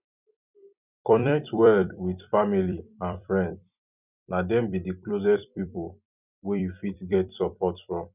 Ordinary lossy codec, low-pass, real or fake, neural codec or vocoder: none; 3.6 kHz; fake; vocoder, 44.1 kHz, 128 mel bands every 256 samples, BigVGAN v2